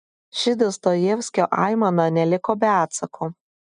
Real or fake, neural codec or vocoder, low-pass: real; none; 9.9 kHz